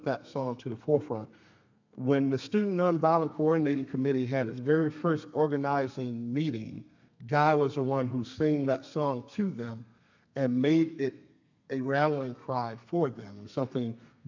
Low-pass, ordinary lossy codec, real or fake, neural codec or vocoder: 7.2 kHz; MP3, 64 kbps; fake; codec, 32 kHz, 1.9 kbps, SNAC